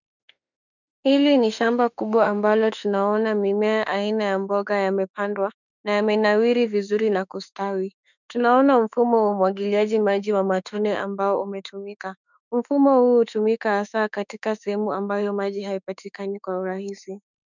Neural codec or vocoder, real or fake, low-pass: autoencoder, 48 kHz, 32 numbers a frame, DAC-VAE, trained on Japanese speech; fake; 7.2 kHz